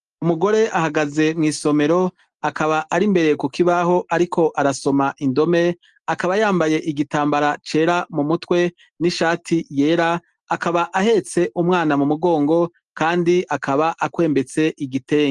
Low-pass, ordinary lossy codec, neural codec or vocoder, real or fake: 10.8 kHz; Opus, 24 kbps; none; real